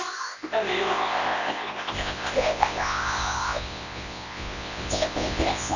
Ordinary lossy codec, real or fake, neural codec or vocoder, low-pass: none; fake; codec, 24 kHz, 0.9 kbps, WavTokenizer, large speech release; 7.2 kHz